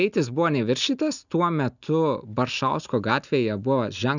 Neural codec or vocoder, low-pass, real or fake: none; 7.2 kHz; real